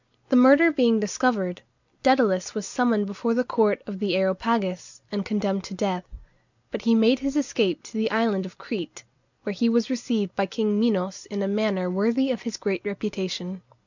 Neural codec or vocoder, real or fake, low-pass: none; real; 7.2 kHz